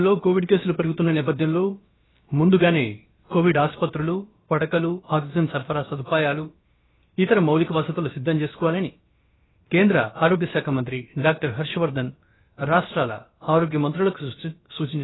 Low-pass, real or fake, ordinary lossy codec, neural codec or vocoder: 7.2 kHz; fake; AAC, 16 kbps; codec, 16 kHz, about 1 kbps, DyCAST, with the encoder's durations